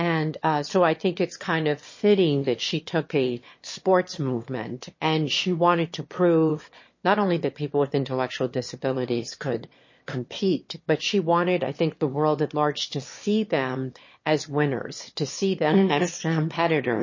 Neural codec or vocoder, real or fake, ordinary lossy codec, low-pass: autoencoder, 22.05 kHz, a latent of 192 numbers a frame, VITS, trained on one speaker; fake; MP3, 32 kbps; 7.2 kHz